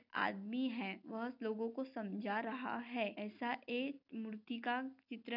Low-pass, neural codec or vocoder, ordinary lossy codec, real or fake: 5.4 kHz; none; none; real